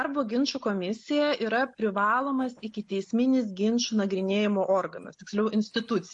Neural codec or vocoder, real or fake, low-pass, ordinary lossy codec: none; real; 10.8 kHz; MP3, 48 kbps